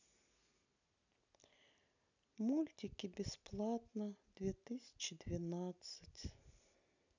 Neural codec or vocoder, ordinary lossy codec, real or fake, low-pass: none; none; real; 7.2 kHz